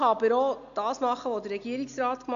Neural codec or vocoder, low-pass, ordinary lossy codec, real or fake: none; 7.2 kHz; none; real